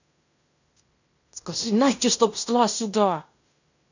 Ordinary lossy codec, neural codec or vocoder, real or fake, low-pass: none; codec, 16 kHz in and 24 kHz out, 0.9 kbps, LongCat-Audio-Codec, fine tuned four codebook decoder; fake; 7.2 kHz